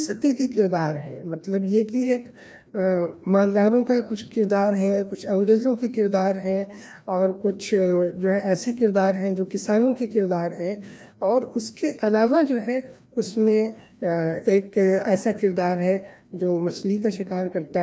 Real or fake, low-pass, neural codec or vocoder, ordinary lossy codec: fake; none; codec, 16 kHz, 1 kbps, FreqCodec, larger model; none